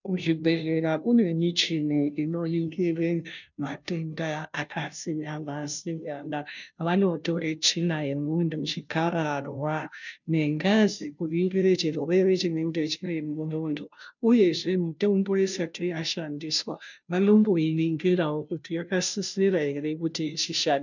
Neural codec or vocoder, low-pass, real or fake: codec, 16 kHz, 0.5 kbps, FunCodec, trained on Chinese and English, 25 frames a second; 7.2 kHz; fake